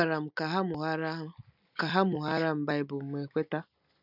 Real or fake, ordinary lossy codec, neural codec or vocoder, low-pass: real; none; none; 5.4 kHz